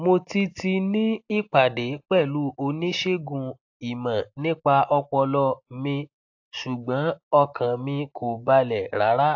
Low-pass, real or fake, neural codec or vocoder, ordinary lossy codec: 7.2 kHz; real; none; AAC, 48 kbps